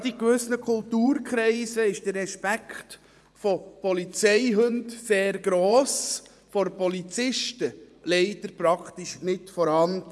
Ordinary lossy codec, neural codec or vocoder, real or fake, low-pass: none; vocoder, 24 kHz, 100 mel bands, Vocos; fake; none